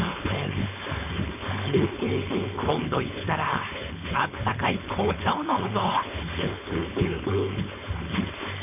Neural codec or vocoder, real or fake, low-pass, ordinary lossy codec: codec, 16 kHz, 4.8 kbps, FACodec; fake; 3.6 kHz; none